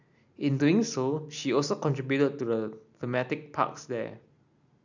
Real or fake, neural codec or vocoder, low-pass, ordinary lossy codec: real; none; 7.2 kHz; none